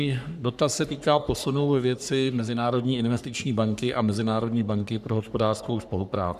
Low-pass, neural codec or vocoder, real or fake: 14.4 kHz; codec, 44.1 kHz, 3.4 kbps, Pupu-Codec; fake